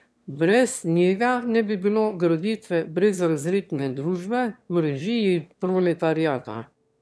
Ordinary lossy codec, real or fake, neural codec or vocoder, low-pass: none; fake; autoencoder, 22.05 kHz, a latent of 192 numbers a frame, VITS, trained on one speaker; none